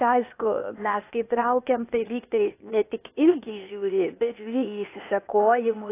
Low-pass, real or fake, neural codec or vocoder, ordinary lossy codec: 3.6 kHz; fake; codec, 16 kHz, 0.8 kbps, ZipCodec; AAC, 24 kbps